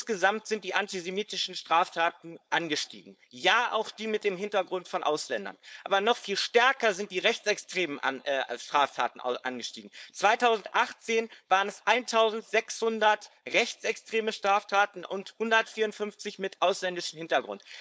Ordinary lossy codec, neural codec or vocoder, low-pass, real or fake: none; codec, 16 kHz, 4.8 kbps, FACodec; none; fake